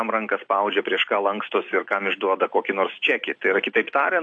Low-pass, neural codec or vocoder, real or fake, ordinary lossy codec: 9.9 kHz; none; real; AAC, 48 kbps